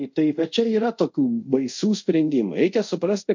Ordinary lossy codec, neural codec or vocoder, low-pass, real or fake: MP3, 48 kbps; codec, 24 kHz, 0.5 kbps, DualCodec; 7.2 kHz; fake